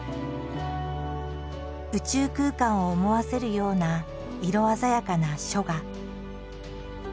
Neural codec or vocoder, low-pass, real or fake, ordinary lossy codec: none; none; real; none